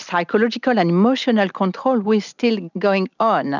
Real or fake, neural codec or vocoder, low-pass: real; none; 7.2 kHz